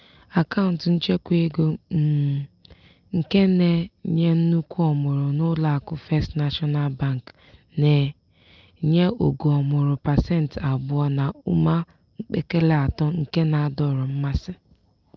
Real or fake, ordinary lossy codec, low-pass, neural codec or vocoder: real; Opus, 24 kbps; 7.2 kHz; none